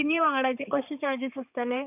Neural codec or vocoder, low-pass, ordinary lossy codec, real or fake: codec, 16 kHz, 4 kbps, X-Codec, HuBERT features, trained on balanced general audio; 3.6 kHz; none; fake